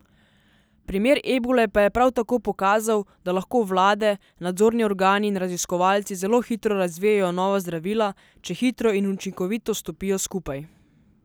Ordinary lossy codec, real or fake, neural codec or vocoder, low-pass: none; real; none; none